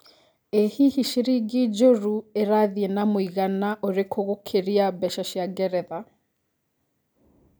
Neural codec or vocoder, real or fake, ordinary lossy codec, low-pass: none; real; none; none